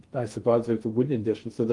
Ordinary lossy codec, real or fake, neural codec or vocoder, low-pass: Opus, 24 kbps; fake; codec, 16 kHz in and 24 kHz out, 0.6 kbps, FocalCodec, streaming, 4096 codes; 10.8 kHz